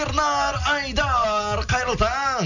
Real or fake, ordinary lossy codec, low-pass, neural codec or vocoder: real; none; 7.2 kHz; none